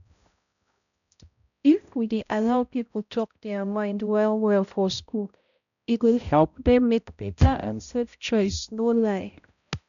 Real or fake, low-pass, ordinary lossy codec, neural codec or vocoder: fake; 7.2 kHz; none; codec, 16 kHz, 0.5 kbps, X-Codec, HuBERT features, trained on balanced general audio